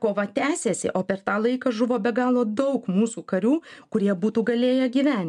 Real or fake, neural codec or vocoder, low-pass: real; none; 10.8 kHz